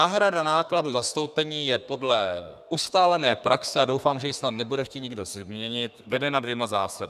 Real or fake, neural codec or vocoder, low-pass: fake; codec, 32 kHz, 1.9 kbps, SNAC; 14.4 kHz